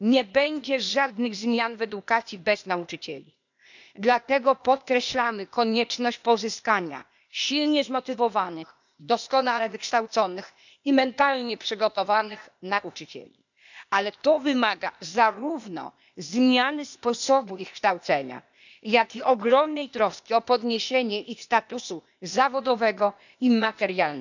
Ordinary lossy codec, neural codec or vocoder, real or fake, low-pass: none; codec, 16 kHz, 0.8 kbps, ZipCodec; fake; 7.2 kHz